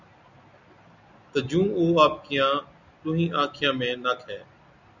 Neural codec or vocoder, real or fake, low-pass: none; real; 7.2 kHz